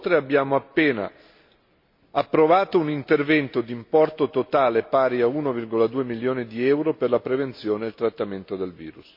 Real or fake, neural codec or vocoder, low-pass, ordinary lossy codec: real; none; 5.4 kHz; MP3, 32 kbps